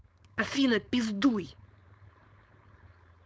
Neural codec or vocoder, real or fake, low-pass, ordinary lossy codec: codec, 16 kHz, 4.8 kbps, FACodec; fake; none; none